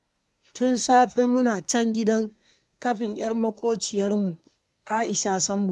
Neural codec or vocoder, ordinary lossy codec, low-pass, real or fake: codec, 24 kHz, 1 kbps, SNAC; none; none; fake